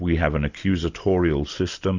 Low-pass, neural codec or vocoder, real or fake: 7.2 kHz; none; real